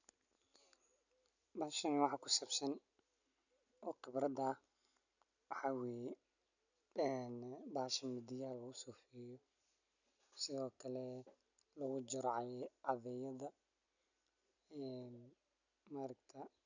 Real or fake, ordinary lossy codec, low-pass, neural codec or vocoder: real; none; 7.2 kHz; none